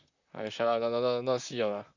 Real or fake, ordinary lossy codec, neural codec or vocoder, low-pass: fake; none; vocoder, 44.1 kHz, 128 mel bands, Pupu-Vocoder; 7.2 kHz